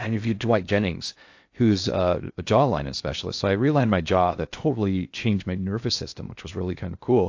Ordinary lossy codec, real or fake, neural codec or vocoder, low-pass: AAC, 48 kbps; fake; codec, 16 kHz in and 24 kHz out, 0.6 kbps, FocalCodec, streaming, 4096 codes; 7.2 kHz